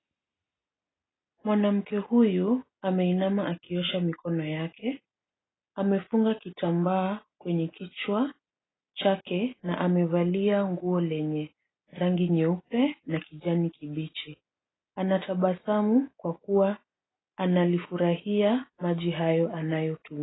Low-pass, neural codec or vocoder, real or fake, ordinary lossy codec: 7.2 kHz; none; real; AAC, 16 kbps